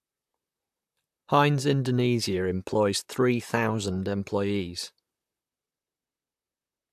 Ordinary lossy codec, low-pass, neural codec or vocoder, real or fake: AAC, 96 kbps; 14.4 kHz; vocoder, 44.1 kHz, 128 mel bands, Pupu-Vocoder; fake